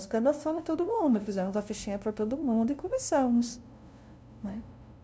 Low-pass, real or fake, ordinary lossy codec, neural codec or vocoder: none; fake; none; codec, 16 kHz, 0.5 kbps, FunCodec, trained on LibriTTS, 25 frames a second